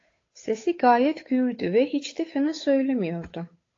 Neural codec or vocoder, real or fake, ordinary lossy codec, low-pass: codec, 16 kHz, 8 kbps, FunCodec, trained on Chinese and English, 25 frames a second; fake; AAC, 32 kbps; 7.2 kHz